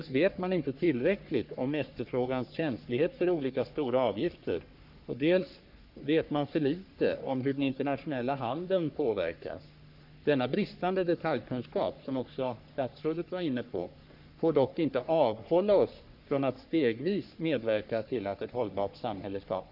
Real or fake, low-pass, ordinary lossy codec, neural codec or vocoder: fake; 5.4 kHz; none; codec, 44.1 kHz, 3.4 kbps, Pupu-Codec